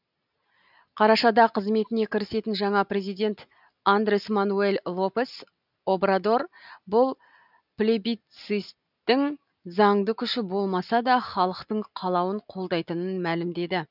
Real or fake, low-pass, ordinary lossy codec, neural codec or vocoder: real; 5.4 kHz; none; none